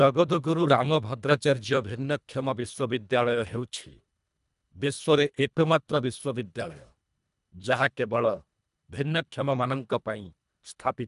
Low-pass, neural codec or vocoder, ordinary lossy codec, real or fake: 10.8 kHz; codec, 24 kHz, 1.5 kbps, HILCodec; none; fake